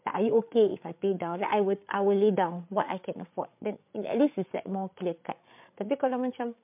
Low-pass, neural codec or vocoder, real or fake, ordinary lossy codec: 3.6 kHz; codec, 16 kHz, 8 kbps, FreqCodec, larger model; fake; MP3, 32 kbps